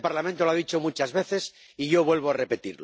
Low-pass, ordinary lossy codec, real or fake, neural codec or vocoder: none; none; real; none